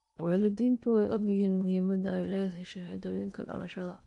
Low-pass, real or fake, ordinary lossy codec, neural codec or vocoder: 10.8 kHz; fake; none; codec, 16 kHz in and 24 kHz out, 0.8 kbps, FocalCodec, streaming, 65536 codes